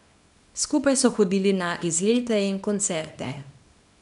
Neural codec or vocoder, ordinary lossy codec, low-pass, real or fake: codec, 24 kHz, 0.9 kbps, WavTokenizer, small release; none; 10.8 kHz; fake